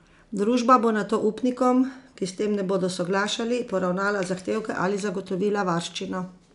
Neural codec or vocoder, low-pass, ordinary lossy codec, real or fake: none; 10.8 kHz; none; real